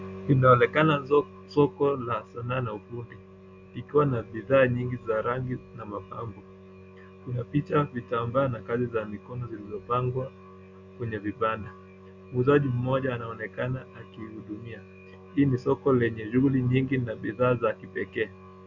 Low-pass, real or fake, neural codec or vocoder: 7.2 kHz; real; none